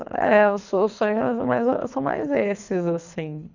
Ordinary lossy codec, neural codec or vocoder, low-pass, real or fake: none; codec, 24 kHz, 3 kbps, HILCodec; 7.2 kHz; fake